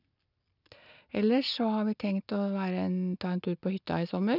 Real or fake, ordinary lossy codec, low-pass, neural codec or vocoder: real; none; 5.4 kHz; none